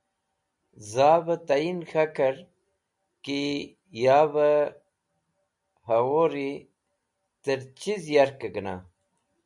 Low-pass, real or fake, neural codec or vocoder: 10.8 kHz; real; none